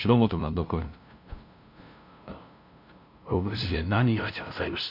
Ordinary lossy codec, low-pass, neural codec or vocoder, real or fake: none; 5.4 kHz; codec, 16 kHz, 0.5 kbps, FunCodec, trained on LibriTTS, 25 frames a second; fake